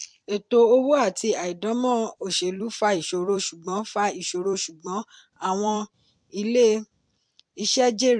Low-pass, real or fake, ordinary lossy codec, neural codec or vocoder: 9.9 kHz; fake; MP3, 64 kbps; vocoder, 44.1 kHz, 128 mel bands every 256 samples, BigVGAN v2